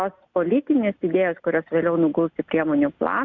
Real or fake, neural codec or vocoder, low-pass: real; none; 7.2 kHz